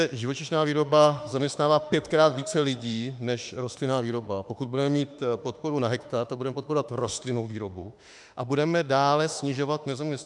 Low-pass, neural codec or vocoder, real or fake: 10.8 kHz; autoencoder, 48 kHz, 32 numbers a frame, DAC-VAE, trained on Japanese speech; fake